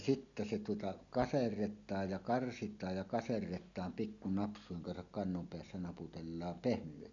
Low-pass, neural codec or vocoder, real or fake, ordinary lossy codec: 7.2 kHz; none; real; none